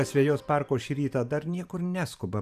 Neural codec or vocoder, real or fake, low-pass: vocoder, 44.1 kHz, 128 mel bands every 512 samples, BigVGAN v2; fake; 14.4 kHz